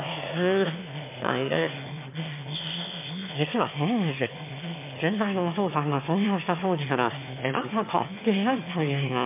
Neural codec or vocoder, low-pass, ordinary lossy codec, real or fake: autoencoder, 22.05 kHz, a latent of 192 numbers a frame, VITS, trained on one speaker; 3.6 kHz; MP3, 32 kbps; fake